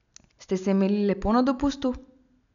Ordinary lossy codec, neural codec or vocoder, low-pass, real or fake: none; none; 7.2 kHz; real